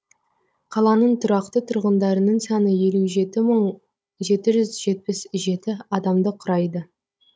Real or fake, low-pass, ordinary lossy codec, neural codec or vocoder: fake; none; none; codec, 16 kHz, 16 kbps, FunCodec, trained on Chinese and English, 50 frames a second